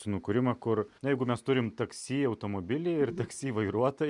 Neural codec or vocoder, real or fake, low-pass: none; real; 10.8 kHz